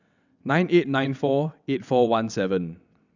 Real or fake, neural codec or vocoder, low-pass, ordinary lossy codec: fake; vocoder, 22.05 kHz, 80 mel bands, WaveNeXt; 7.2 kHz; none